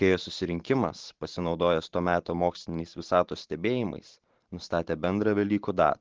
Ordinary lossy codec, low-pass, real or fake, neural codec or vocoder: Opus, 16 kbps; 7.2 kHz; fake; vocoder, 44.1 kHz, 128 mel bands every 512 samples, BigVGAN v2